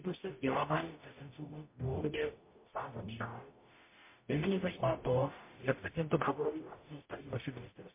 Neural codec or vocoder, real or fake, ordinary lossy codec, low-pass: codec, 44.1 kHz, 0.9 kbps, DAC; fake; MP3, 24 kbps; 3.6 kHz